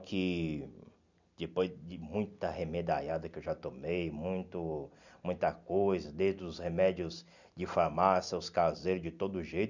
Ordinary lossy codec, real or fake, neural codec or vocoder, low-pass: none; real; none; 7.2 kHz